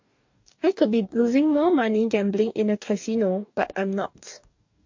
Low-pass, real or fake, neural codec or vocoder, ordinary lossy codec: 7.2 kHz; fake; codec, 44.1 kHz, 2.6 kbps, DAC; MP3, 48 kbps